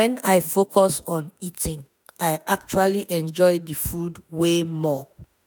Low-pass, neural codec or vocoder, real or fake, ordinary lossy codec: none; autoencoder, 48 kHz, 32 numbers a frame, DAC-VAE, trained on Japanese speech; fake; none